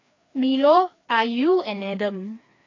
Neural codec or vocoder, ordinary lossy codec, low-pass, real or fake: codec, 16 kHz, 2 kbps, FreqCodec, larger model; AAC, 32 kbps; 7.2 kHz; fake